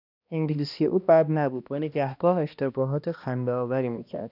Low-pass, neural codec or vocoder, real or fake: 5.4 kHz; codec, 16 kHz, 1 kbps, X-Codec, HuBERT features, trained on balanced general audio; fake